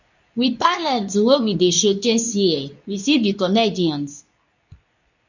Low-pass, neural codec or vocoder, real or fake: 7.2 kHz; codec, 24 kHz, 0.9 kbps, WavTokenizer, medium speech release version 2; fake